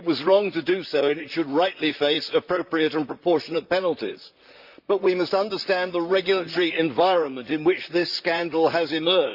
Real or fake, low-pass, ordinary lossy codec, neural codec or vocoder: fake; 5.4 kHz; Opus, 64 kbps; vocoder, 44.1 kHz, 128 mel bands, Pupu-Vocoder